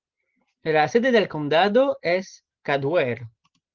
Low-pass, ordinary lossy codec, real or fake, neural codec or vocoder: 7.2 kHz; Opus, 16 kbps; real; none